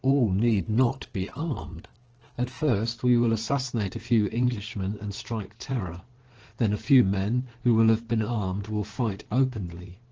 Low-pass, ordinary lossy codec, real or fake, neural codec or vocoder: 7.2 kHz; Opus, 16 kbps; fake; codec, 16 kHz in and 24 kHz out, 2.2 kbps, FireRedTTS-2 codec